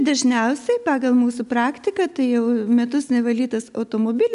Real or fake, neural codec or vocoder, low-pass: real; none; 10.8 kHz